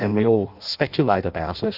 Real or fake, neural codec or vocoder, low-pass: fake; codec, 16 kHz in and 24 kHz out, 0.6 kbps, FireRedTTS-2 codec; 5.4 kHz